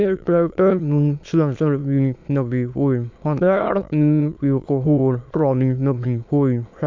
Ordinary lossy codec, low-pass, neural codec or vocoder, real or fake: MP3, 64 kbps; 7.2 kHz; autoencoder, 22.05 kHz, a latent of 192 numbers a frame, VITS, trained on many speakers; fake